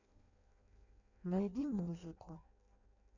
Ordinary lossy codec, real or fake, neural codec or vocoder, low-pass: none; fake; codec, 16 kHz in and 24 kHz out, 0.6 kbps, FireRedTTS-2 codec; 7.2 kHz